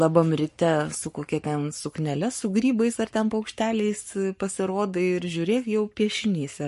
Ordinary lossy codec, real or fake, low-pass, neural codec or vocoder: MP3, 48 kbps; fake; 14.4 kHz; codec, 44.1 kHz, 7.8 kbps, Pupu-Codec